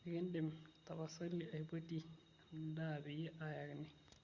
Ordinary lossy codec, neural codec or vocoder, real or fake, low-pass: Opus, 64 kbps; none; real; 7.2 kHz